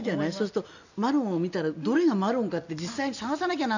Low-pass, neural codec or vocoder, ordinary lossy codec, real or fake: 7.2 kHz; none; none; real